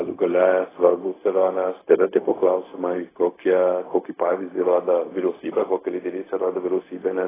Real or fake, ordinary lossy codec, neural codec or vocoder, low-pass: fake; AAC, 16 kbps; codec, 16 kHz, 0.4 kbps, LongCat-Audio-Codec; 3.6 kHz